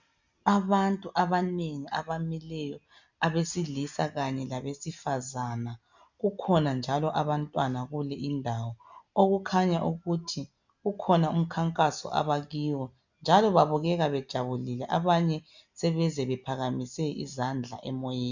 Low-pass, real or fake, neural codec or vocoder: 7.2 kHz; real; none